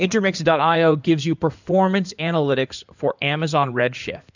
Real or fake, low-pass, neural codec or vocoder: fake; 7.2 kHz; codec, 16 kHz in and 24 kHz out, 2.2 kbps, FireRedTTS-2 codec